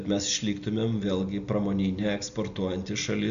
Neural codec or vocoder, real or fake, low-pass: none; real; 7.2 kHz